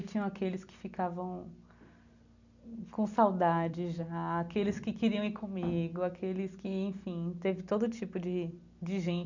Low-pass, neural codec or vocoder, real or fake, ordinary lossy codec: 7.2 kHz; none; real; none